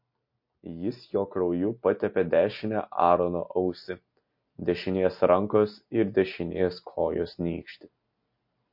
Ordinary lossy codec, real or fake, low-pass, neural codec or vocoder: MP3, 32 kbps; real; 5.4 kHz; none